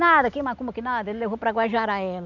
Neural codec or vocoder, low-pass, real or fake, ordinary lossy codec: none; 7.2 kHz; real; none